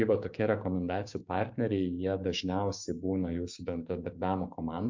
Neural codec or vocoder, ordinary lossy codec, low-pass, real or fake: codec, 16 kHz, 6 kbps, DAC; MP3, 64 kbps; 7.2 kHz; fake